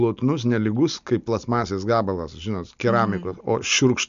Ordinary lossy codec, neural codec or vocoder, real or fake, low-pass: MP3, 64 kbps; none; real; 7.2 kHz